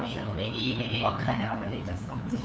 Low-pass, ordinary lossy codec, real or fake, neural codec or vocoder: none; none; fake; codec, 16 kHz, 2 kbps, FunCodec, trained on LibriTTS, 25 frames a second